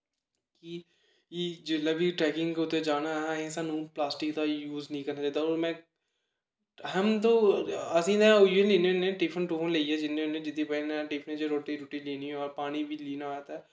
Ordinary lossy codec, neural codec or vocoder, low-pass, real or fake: none; none; none; real